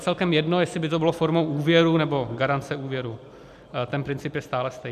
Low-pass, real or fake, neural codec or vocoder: 14.4 kHz; real; none